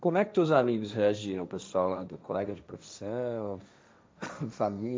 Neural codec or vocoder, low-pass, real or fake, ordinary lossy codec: codec, 16 kHz, 1.1 kbps, Voila-Tokenizer; none; fake; none